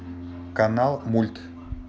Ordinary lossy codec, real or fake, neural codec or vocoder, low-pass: none; real; none; none